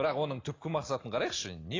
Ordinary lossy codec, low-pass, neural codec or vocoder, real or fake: AAC, 32 kbps; 7.2 kHz; none; real